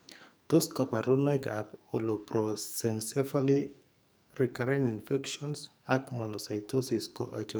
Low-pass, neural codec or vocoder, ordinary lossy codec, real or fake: none; codec, 44.1 kHz, 2.6 kbps, SNAC; none; fake